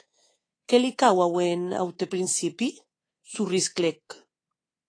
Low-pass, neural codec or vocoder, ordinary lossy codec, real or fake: 9.9 kHz; codec, 24 kHz, 3.1 kbps, DualCodec; AAC, 32 kbps; fake